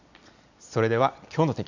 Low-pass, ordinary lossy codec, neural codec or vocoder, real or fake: 7.2 kHz; none; none; real